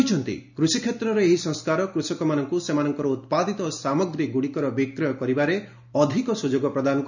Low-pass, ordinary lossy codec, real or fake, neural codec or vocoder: 7.2 kHz; none; real; none